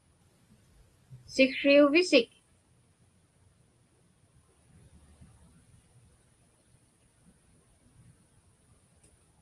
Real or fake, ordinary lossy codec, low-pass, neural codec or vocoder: real; Opus, 32 kbps; 10.8 kHz; none